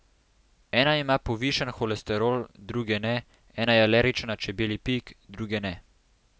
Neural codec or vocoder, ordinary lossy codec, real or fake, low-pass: none; none; real; none